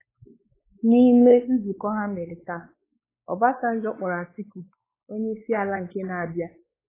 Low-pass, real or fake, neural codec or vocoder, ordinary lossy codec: 3.6 kHz; fake; codec, 16 kHz, 4 kbps, X-Codec, WavLM features, trained on Multilingual LibriSpeech; AAC, 16 kbps